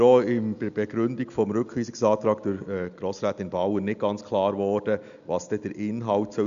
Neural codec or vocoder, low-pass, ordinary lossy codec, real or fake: none; 7.2 kHz; none; real